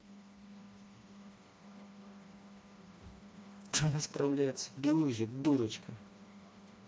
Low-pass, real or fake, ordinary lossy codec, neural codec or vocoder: none; fake; none; codec, 16 kHz, 2 kbps, FreqCodec, smaller model